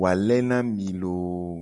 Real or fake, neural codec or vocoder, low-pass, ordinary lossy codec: real; none; 10.8 kHz; MP3, 96 kbps